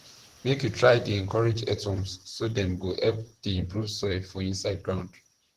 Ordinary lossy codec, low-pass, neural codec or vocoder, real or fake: Opus, 16 kbps; 14.4 kHz; vocoder, 44.1 kHz, 128 mel bands, Pupu-Vocoder; fake